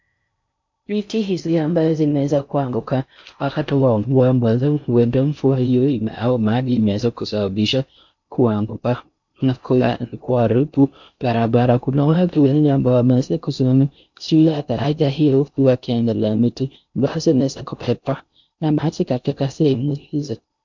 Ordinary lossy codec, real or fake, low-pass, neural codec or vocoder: MP3, 64 kbps; fake; 7.2 kHz; codec, 16 kHz in and 24 kHz out, 0.6 kbps, FocalCodec, streaming, 4096 codes